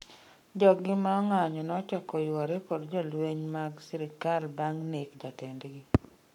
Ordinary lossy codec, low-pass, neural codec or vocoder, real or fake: none; 19.8 kHz; codec, 44.1 kHz, 7.8 kbps, Pupu-Codec; fake